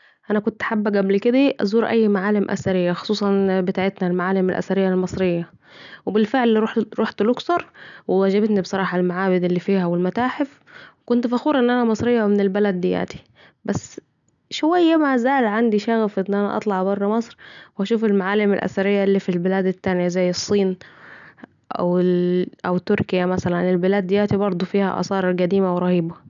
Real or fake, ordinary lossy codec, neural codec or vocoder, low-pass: real; none; none; 7.2 kHz